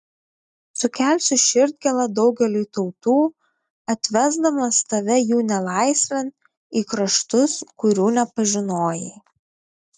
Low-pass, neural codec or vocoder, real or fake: 10.8 kHz; none; real